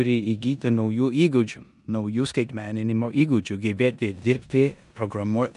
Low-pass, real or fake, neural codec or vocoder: 10.8 kHz; fake; codec, 16 kHz in and 24 kHz out, 0.9 kbps, LongCat-Audio-Codec, four codebook decoder